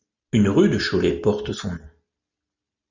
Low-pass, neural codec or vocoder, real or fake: 7.2 kHz; none; real